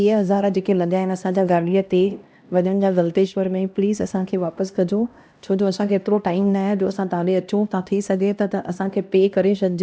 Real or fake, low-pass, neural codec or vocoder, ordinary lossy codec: fake; none; codec, 16 kHz, 1 kbps, X-Codec, HuBERT features, trained on LibriSpeech; none